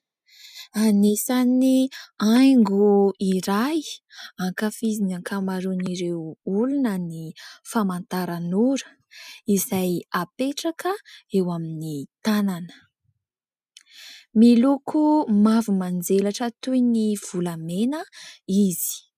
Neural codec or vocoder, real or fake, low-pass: none; real; 14.4 kHz